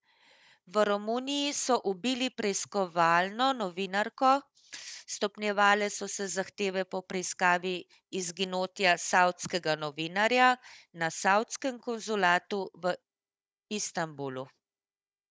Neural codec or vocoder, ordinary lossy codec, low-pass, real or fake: codec, 16 kHz, 16 kbps, FunCodec, trained on Chinese and English, 50 frames a second; none; none; fake